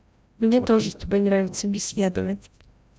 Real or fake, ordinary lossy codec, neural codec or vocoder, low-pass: fake; none; codec, 16 kHz, 0.5 kbps, FreqCodec, larger model; none